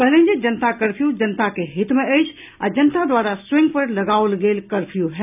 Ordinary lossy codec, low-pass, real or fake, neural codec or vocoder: none; 3.6 kHz; real; none